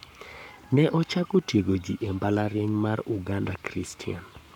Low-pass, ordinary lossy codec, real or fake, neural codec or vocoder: 19.8 kHz; none; fake; codec, 44.1 kHz, 7.8 kbps, Pupu-Codec